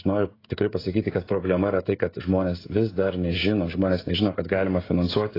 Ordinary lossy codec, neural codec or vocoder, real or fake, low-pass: AAC, 24 kbps; codec, 16 kHz, 16 kbps, FreqCodec, smaller model; fake; 5.4 kHz